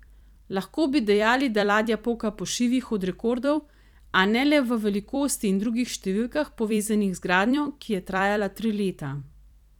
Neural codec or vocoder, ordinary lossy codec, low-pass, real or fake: vocoder, 44.1 kHz, 128 mel bands every 256 samples, BigVGAN v2; none; 19.8 kHz; fake